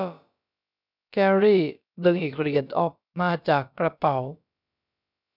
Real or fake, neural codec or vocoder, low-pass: fake; codec, 16 kHz, about 1 kbps, DyCAST, with the encoder's durations; 5.4 kHz